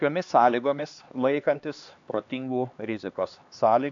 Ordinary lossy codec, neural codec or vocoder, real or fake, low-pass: AAC, 64 kbps; codec, 16 kHz, 2 kbps, X-Codec, HuBERT features, trained on LibriSpeech; fake; 7.2 kHz